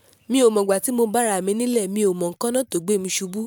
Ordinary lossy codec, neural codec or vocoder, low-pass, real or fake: none; vocoder, 44.1 kHz, 128 mel bands every 512 samples, BigVGAN v2; 19.8 kHz; fake